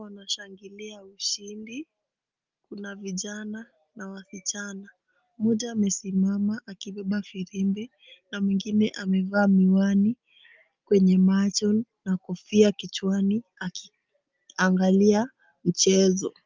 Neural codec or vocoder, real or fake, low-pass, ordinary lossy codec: none; real; 7.2 kHz; Opus, 24 kbps